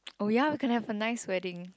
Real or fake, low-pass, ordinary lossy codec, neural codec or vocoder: real; none; none; none